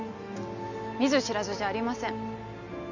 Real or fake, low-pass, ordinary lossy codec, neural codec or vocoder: real; 7.2 kHz; none; none